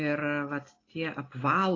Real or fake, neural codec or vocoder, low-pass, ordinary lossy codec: real; none; 7.2 kHz; AAC, 32 kbps